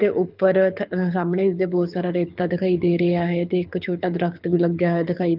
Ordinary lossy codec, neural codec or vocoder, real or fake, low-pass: Opus, 32 kbps; codec, 24 kHz, 6 kbps, HILCodec; fake; 5.4 kHz